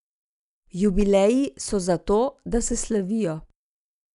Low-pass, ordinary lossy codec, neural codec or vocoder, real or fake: 10.8 kHz; none; none; real